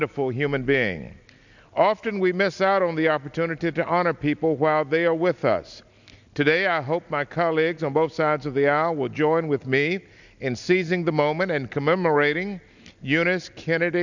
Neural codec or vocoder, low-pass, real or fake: none; 7.2 kHz; real